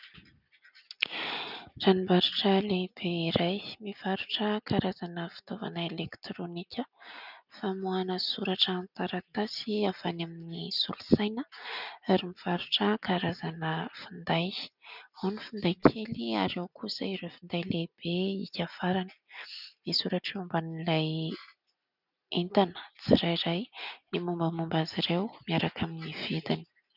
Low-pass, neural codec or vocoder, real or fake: 5.4 kHz; none; real